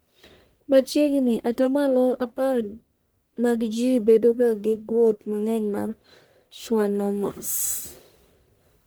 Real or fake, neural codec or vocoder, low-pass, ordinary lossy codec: fake; codec, 44.1 kHz, 1.7 kbps, Pupu-Codec; none; none